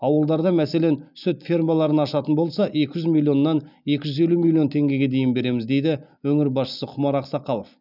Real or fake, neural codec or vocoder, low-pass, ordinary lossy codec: real; none; 5.4 kHz; none